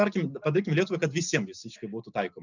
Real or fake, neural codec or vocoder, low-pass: real; none; 7.2 kHz